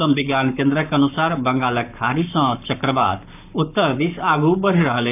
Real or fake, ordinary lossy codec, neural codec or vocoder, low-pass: fake; none; codec, 44.1 kHz, 7.8 kbps, Pupu-Codec; 3.6 kHz